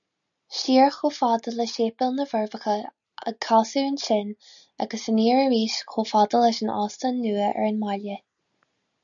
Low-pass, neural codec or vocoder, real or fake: 7.2 kHz; none; real